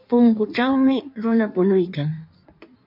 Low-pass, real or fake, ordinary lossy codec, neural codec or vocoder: 5.4 kHz; fake; MP3, 48 kbps; codec, 16 kHz in and 24 kHz out, 1.1 kbps, FireRedTTS-2 codec